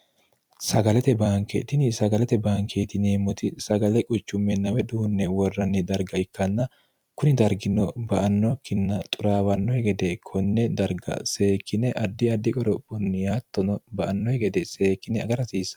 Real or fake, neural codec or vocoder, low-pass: fake; vocoder, 44.1 kHz, 128 mel bands every 256 samples, BigVGAN v2; 19.8 kHz